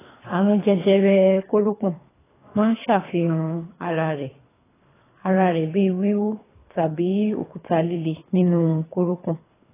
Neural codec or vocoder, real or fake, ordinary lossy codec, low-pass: codec, 24 kHz, 3 kbps, HILCodec; fake; AAC, 16 kbps; 3.6 kHz